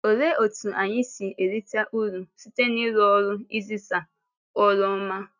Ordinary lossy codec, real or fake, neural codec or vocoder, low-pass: none; real; none; 7.2 kHz